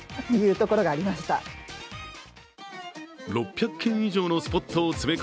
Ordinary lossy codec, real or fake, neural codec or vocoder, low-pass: none; real; none; none